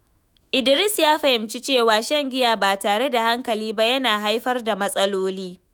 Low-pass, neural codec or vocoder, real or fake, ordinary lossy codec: none; autoencoder, 48 kHz, 128 numbers a frame, DAC-VAE, trained on Japanese speech; fake; none